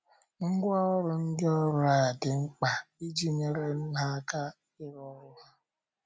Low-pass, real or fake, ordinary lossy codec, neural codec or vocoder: none; real; none; none